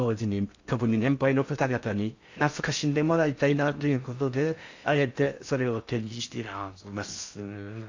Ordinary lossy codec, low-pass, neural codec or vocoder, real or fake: AAC, 48 kbps; 7.2 kHz; codec, 16 kHz in and 24 kHz out, 0.6 kbps, FocalCodec, streaming, 4096 codes; fake